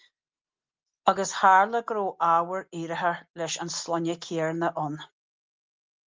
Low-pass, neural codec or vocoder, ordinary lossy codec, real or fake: 7.2 kHz; none; Opus, 24 kbps; real